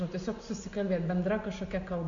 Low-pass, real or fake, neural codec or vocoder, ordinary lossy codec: 7.2 kHz; real; none; AAC, 48 kbps